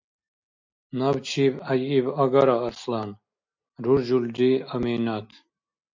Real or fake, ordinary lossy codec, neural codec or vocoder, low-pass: real; MP3, 64 kbps; none; 7.2 kHz